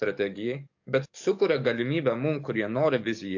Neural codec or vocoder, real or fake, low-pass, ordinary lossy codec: codec, 44.1 kHz, 7.8 kbps, DAC; fake; 7.2 kHz; AAC, 48 kbps